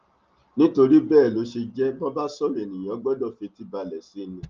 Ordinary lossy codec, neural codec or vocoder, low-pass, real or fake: Opus, 24 kbps; none; 7.2 kHz; real